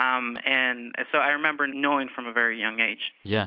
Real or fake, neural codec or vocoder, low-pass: real; none; 5.4 kHz